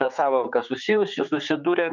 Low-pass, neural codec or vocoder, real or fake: 7.2 kHz; codec, 16 kHz, 4 kbps, X-Codec, HuBERT features, trained on general audio; fake